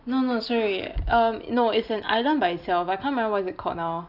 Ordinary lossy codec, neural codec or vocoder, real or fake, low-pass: none; none; real; 5.4 kHz